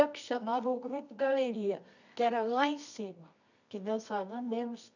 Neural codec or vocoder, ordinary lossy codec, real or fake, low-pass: codec, 24 kHz, 0.9 kbps, WavTokenizer, medium music audio release; none; fake; 7.2 kHz